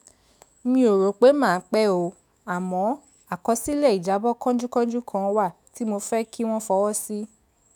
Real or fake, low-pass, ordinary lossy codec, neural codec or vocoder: fake; none; none; autoencoder, 48 kHz, 128 numbers a frame, DAC-VAE, trained on Japanese speech